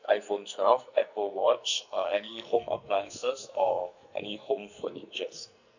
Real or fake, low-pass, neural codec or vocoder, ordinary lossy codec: fake; 7.2 kHz; codec, 44.1 kHz, 2.6 kbps, SNAC; none